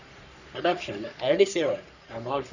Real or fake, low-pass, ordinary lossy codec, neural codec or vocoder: fake; 7.2 kHz; none; codec, 44.1 kHz, 3.4 kbps, Pupu-Codec